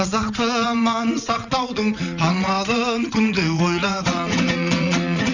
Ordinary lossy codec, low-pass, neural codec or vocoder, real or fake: none; 7.2 kHz; vocoder, 22.05 kHz, 80 mel bands, WaveNeXt; fake